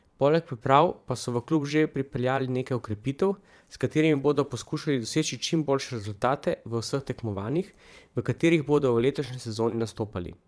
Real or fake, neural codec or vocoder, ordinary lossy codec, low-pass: fake; vocoder, 22.05 kHz, 80 mel bands, Vocos; none; none